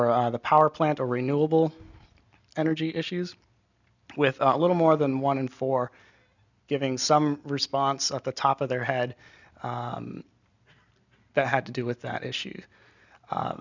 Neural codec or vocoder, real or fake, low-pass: none; real; 7.2 kHz